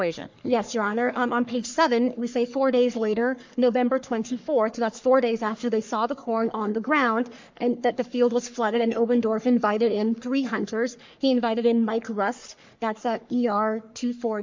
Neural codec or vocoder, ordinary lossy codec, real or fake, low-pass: codec, 44.1 kHz, 3.4 kbps, Pupu-Codec; MP3, 64 kbps; fake; 7.2 kHz